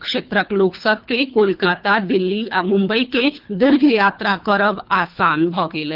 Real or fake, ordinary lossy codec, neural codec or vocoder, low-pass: fake; Opus, 32 kbps; codec, 24 kHz, 3 kbps, HILCodec; 5.4 kHz